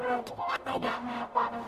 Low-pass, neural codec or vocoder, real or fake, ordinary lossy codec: 14.4 kHz; codec, 44.1 kHz, 0.9 kbps, DAC; fake; AAC, 96 kbps